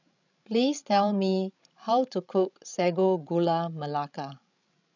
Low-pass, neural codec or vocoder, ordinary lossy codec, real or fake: 7.2 kHz; codec, 16 kHz, 16 kbps, FreqCodec, larger model; none; fake